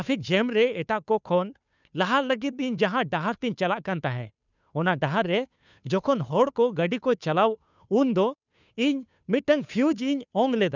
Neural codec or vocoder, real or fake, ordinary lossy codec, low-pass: codec, 16 kHz, 2 kbps, FunCodec, trained on Chinese and English, 25 frames a second; fake; none; 7.2 kHz